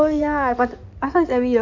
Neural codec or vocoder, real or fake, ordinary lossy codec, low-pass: codec, 16 kHz in and 24 kHz out, 2.2 kbps, FireRedTTS-2 codec; fake; none; 7.2 kHz